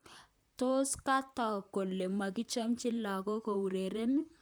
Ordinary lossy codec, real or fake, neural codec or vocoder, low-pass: none; fake; vocoder, 44.1 kHz, 128 mel bands, Pupu-Vocoder; none